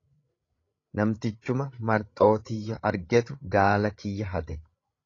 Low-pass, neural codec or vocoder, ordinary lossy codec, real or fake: 7.2 kHz; codec, 16 kHz, 8 kbps, FreqCodec, larger model; AAC, 32 kbps; fake